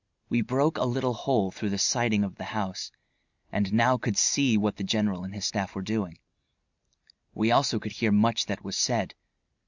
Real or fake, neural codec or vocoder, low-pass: real; none; 7.2 kHz